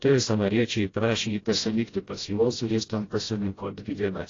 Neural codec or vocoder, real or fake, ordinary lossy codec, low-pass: codec, 16 kHz, 0.5 kbps, FreqCodec, smaller model; fake; AAC, 32 kbps; 7.2 kHz